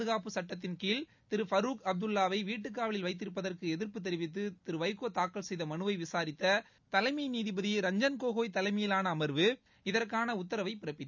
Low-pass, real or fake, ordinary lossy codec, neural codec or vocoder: 7.2 kHz; real; none; none